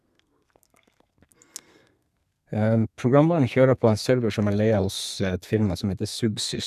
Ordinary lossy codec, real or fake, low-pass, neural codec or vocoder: none; fake; 14.4 kHz; codec, 32 kHz, 1.9 kbps, SNAC